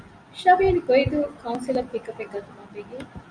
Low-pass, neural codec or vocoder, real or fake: 9.9 kHz; none; real